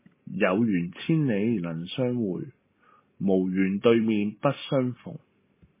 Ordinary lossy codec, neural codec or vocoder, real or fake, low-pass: MP3, 16 kbps; none; real; 3.6 kHz